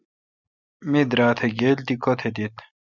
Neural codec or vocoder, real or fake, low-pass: none; real; 7.2 kHz